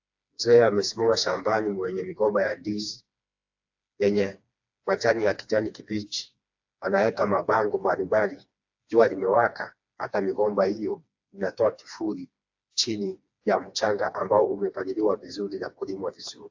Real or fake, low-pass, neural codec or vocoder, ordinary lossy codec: fake; 7.2 kHz; codec, 16 kHz, 2 kbps, FreqCodec, smaller model; AAC, 48 kbps